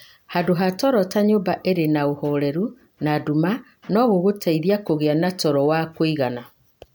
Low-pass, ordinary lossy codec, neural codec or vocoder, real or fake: none; none; none; real